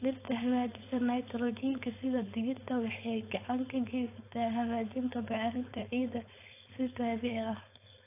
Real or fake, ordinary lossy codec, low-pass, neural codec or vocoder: fake; AAC, 24 kbps; 3.6 kHz; codec, 16 kHz, 4.8 kbps, FACodec